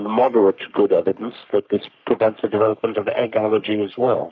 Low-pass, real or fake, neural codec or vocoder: 7.2 kHz; fake; codec, 44.1 kHz, 3.4 kbps, Pupu-Codec